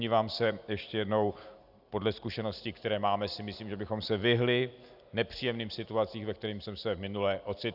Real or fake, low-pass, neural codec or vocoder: real; 5.4 kHz; none